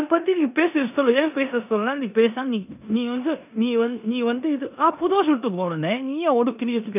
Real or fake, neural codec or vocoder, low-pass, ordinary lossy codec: fake; codec, 16 kHz in and 24 kHz out, 0.9 kbps, LongCat-Audio-Codec, four codebook decoder; 3.6 kHz; none